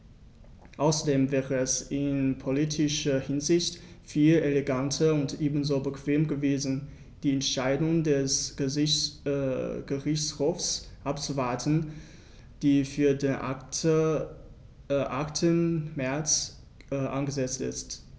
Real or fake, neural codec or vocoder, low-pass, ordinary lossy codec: real; none; none; none